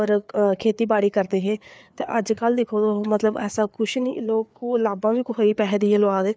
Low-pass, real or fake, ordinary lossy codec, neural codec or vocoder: none; fake; none; codec, 16 kHz, 4 kbps, FreqCodec, larger model